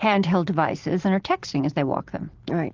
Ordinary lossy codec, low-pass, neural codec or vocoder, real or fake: Opus, 16 kbps; 7.2 kHz; none; real